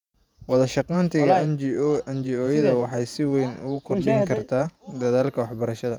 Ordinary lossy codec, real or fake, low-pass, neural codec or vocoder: none; fake; 19.8 kHz; vocoder, 48 kHz, 128 mel bands, Vocos